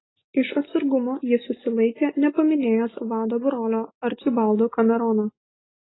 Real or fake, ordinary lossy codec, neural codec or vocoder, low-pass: real; AAC, 16 kbps; none; 7.2 kHz